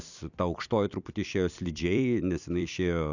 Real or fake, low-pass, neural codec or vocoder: fake; 7.2 kHz; vocoder, 44.1 kHz, 128 mel bands every 256 samples, BigVGAN v2